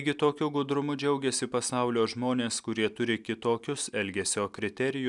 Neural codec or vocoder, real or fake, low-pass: none; real; 10.8 kHz